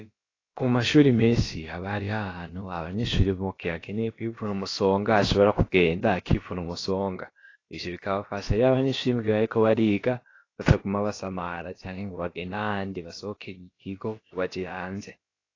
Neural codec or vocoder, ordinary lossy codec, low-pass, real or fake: codec, 16 kHz, about 1 kbps, DyCAST, with the encoder's durations; AAC, 32 kbps; 7.2 kHz; fake